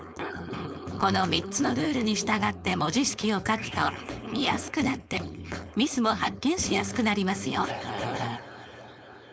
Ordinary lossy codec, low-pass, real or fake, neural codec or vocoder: none; none; fake; codec, 16 kHz, 4.8 kbps, FACodec